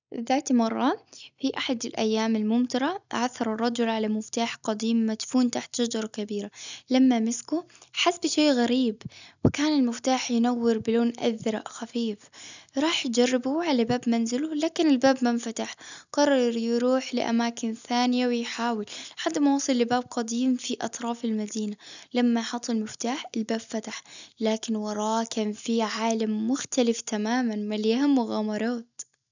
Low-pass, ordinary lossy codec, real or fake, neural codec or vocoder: 7.2 kHz; none; real; none